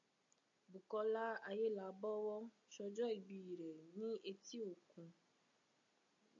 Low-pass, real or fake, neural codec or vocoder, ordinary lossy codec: 7.2 kHz; real; none; MP3, 48 kbps